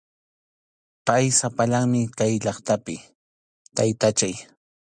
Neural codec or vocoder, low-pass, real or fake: none; 9.9 kHz; real